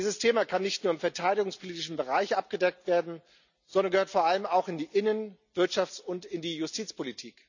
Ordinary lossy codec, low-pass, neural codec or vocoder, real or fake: none; 7.2 kHz; none; real